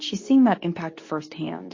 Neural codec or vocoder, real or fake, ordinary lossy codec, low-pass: codec, 24 kHz, 0.9 kbps, WavTokenizer, medium speech release version 2; fake; MP3, 32 kbps; 7.2 kHz